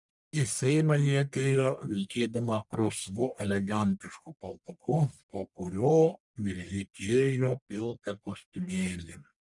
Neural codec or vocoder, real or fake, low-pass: codec, 44.1 kHz, 1.7 kbps, Pupu-Codec; fake; 10.8 kHz